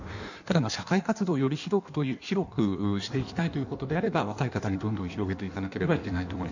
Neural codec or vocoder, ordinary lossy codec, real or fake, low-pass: codec, 16 kHz in and 24 kHz out, 1.1 kbps, FireRedTTS-2 codec; none; fake; 7.2 kHz